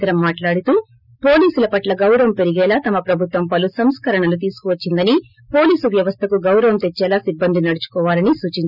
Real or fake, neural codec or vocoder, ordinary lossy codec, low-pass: real; none; none; 5.4 kHz